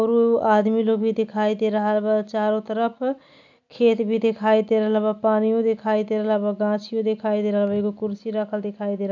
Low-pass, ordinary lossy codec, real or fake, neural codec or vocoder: 7.2 kHz; none; real; none